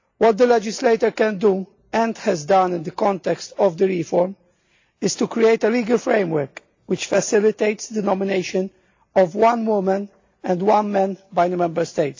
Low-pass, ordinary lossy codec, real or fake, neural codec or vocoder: 7.2 kHz; AAC, 48 kbps; real; none